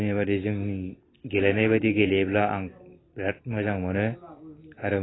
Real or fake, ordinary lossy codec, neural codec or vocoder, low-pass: real; AAC, 16 kbps; none; 7.2 kHz